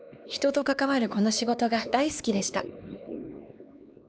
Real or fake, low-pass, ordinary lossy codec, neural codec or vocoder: fake; none; none; codec, 16 kHz, 2 kbps, X-Codec, HuBERT features, trained on LibriSpeech